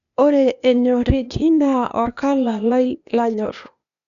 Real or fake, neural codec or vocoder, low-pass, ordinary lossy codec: fake; codec, 16 kHz, 0.8 kbps, ZipCodec; 7.2 kHz; none